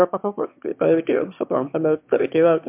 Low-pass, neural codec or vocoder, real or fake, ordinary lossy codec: 3.6 kHz; autoencoder, 22.05 kHz, a latent of 192 numbers a frame, VITS, trained on one speaker; fake; MP3, 32 kbps